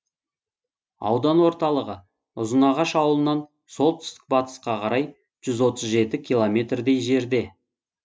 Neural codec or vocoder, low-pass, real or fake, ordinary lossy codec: none; none; real; none